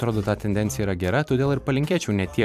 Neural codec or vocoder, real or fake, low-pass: vocoder, 44.1 kHz, 128 mel bands every 256 samples, BigVGAN v2; fake; 14.4 kHz